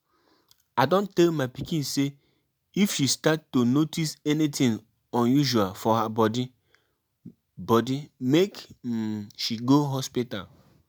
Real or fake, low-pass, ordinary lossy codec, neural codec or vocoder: real; none; none; none